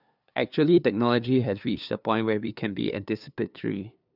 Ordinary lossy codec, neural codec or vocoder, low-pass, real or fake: none; codec, 16 kHz, 2 kbps, FunCodec, trained on LibriTTS, 25 frames a second; 5.4 kHz; fake